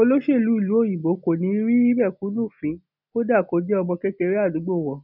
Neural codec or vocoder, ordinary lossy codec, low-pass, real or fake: none; none; 5.4 kHz; real